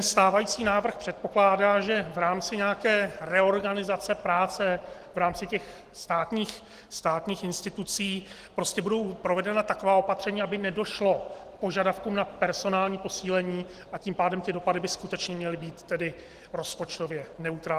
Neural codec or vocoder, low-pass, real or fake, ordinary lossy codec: none; 14.4 kHz; real; Opus, 16 kbps